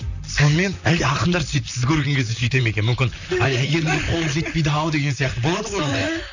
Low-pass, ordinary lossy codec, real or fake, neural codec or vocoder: 7.2 kHz; none; fake; vocoder, 44.1 kHz, 128 mel bands, Pupu-Vocoder